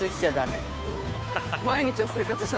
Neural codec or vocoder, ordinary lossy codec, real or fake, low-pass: codec, 16 kHz, 2 kbps, FunCodec, trained on Chinese and English, 25 frames a second; none; fake; none